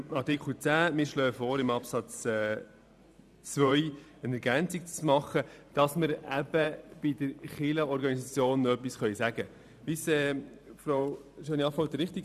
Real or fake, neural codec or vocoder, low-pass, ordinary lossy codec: fake; vocoder, 44.1 kHz, 128 mel bands every 512 samples, BigVGAN v2; 14.4 kHz; none